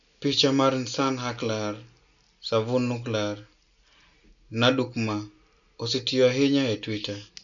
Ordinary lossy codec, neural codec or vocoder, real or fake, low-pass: none; none; real; 7.2 kHz